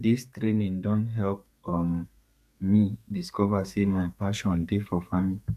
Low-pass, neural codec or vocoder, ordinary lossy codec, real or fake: 14.4 kHz; codec, 32 kHz, 1.9 kbps, SNAC; none; fake